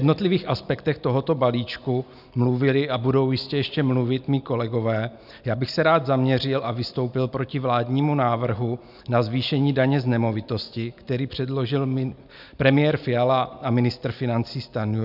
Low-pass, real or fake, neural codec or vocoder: 5.4 kHz; real; none